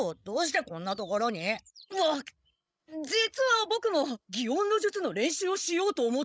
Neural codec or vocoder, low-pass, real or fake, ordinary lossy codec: codec, 16 kHz, 16 kbps, FreqCodec, larger model; none; fake; none